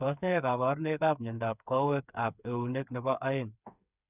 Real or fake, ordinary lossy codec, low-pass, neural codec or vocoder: fake; none; 3.6 kHz; codec, 16 kHz, 4 kbps, FreqCodec, smaller model